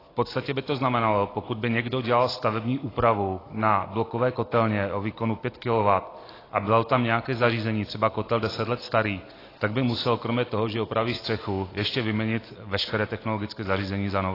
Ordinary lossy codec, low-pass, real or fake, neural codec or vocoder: AAC, 24 kbps; 5.4 kHz; real; none